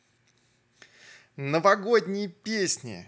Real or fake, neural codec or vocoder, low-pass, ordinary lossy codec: real; none; none; none